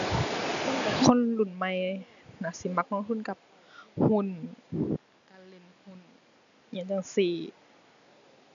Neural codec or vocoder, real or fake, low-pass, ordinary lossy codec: none; real; 7.2 kHz; none